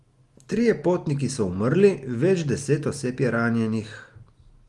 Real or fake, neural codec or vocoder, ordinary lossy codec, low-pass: real; none; Opus, 24 kbps; 10.8 kHz